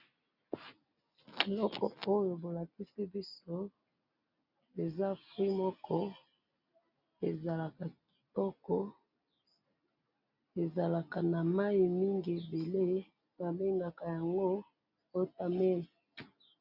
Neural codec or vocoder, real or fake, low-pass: none; real; 5.4 kHz